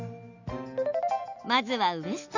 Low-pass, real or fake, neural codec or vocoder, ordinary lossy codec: 7.2 kHz; real; none; none